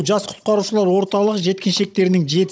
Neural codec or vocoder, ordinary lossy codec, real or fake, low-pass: codec, 16 kHz, 16 kbps, FunCodec, trained on Chinese and English, 50 frames a second; none; fake; none